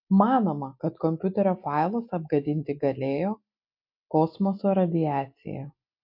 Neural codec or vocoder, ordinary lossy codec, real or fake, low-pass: none; MP3, 32 kbps; real; 5.4 kHz